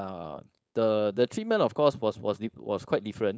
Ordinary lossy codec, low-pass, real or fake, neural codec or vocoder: none; none; fake; codec, 16 kHz, 4.8 kbps, FACodec